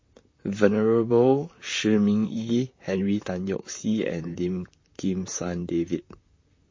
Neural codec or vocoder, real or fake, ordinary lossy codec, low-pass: vocoder, 44.1 kHz, 128 mel bands, Pupu-Vocoder; fake; MP3, 32 kbps; 7.2 kHz